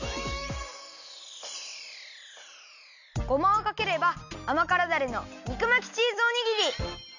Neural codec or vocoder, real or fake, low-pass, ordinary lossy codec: none; real; 7.2 kHz; none